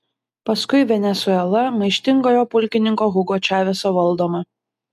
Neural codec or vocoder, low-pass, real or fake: none; 14.4 kHz; real